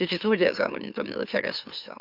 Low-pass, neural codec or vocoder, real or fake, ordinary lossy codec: 5.4 kHz; autoencoder, 44.1 kHz, a latent of 192 numbers a frame, MeloTTS; fake; Opus, 64 kbps